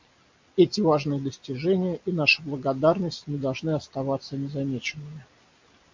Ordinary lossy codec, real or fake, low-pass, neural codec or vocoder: MP3, 64 kbps; real; 7.2 kHz; none